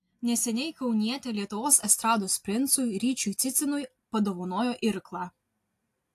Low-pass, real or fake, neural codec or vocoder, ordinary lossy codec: 14.4 kHz; real; none; AAC, 64 kbps